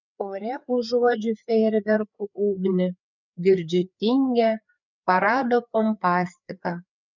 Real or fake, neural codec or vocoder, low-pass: fake; codec, 16 kHz, 4 kbps, FreqCodec, larger model; 7.2 kHz